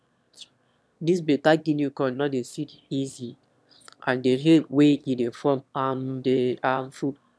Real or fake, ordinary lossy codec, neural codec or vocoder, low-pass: fake; none; autoencoder, 22.05 kHz, a latent of 192 numbers a frame, VITS, trained on one speaker; none